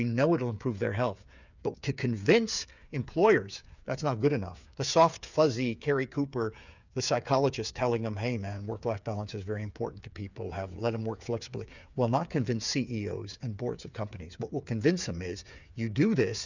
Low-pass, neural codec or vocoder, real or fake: 7.2 kHz; codec, 16 kHz, 8 kbps, FreqCodec, smaller model; fake